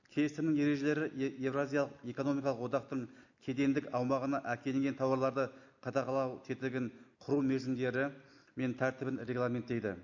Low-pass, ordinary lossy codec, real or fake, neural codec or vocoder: 7.2 kHz; none; real; none